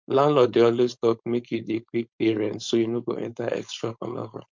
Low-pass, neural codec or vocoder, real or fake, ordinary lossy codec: 7.2 kHz; codec, 16 kHz, 4.8 kbps, FACodec; fake; MP3, 64 kbps